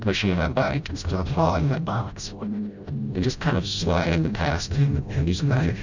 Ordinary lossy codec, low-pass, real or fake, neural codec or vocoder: Opus, 64 kbps; 7.2 kHz; fake; codec, 16 kHz, 0.5 kbps, FreqCodec, smaller model